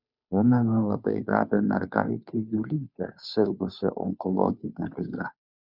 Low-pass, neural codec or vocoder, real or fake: 5.4 kHz; codec, 16 kHz, 2 kbps, FunCodec, trained on Chinese and English, 25 frames a second; fake